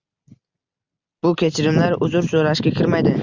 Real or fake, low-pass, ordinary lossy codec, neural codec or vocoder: real; 7.2 kHz; Opus, 64 kbps; none